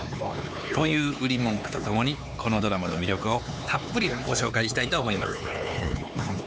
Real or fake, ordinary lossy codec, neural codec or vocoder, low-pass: fake; none; codec, 16 kHz, 4 kbps, X-Codec, HuBERT features, trained on LibriSpeech; none